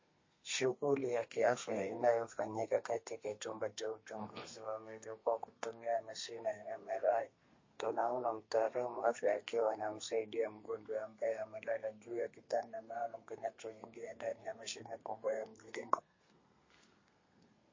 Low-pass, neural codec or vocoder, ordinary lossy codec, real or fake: 7.2 kHz; codec, 32 kHz, 1.9 kbps, SNAC; MP3, 32 kbps; fake